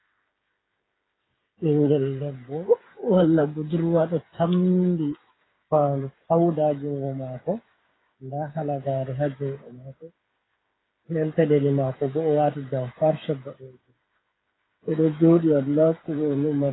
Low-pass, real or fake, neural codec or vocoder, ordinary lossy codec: 7.2 kHz; fake; codec, 16 kHz, 8 kbps, FreqCodec, smaller model; AAC, 16 kbps